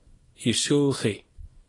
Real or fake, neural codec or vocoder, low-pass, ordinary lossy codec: fake; codec, 24 kHz, 0.9 kbps, WavTokenizer, small release; 10.8 kHz; AAC, 32 kbps